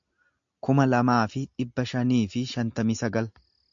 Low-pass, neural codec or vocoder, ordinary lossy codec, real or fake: 7.2 kHz; none; AAC, 64 kbps; real